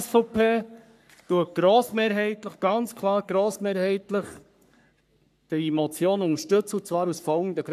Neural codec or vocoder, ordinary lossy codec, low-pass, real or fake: codec, 44.1 kHz, 3.4 kbps, Pupu-Codec; none; 14.4 kHz; fake